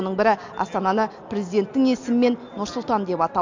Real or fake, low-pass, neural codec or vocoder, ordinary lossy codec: real; 7.2 kHz; none; MP3, 48 kbps